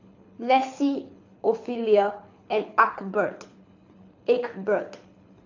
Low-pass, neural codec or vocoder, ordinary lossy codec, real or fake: 7.2 kHz; codec, 24 kHz, 6 kbps, HILCodec; AAC, 48 kbps; fake